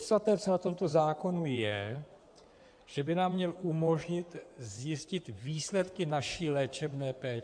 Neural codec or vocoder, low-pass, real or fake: codec, 16 kHz in and 24 kHz out, 2.2 kbps, FireRedTTS-2 codec; 9.9 kHz; fake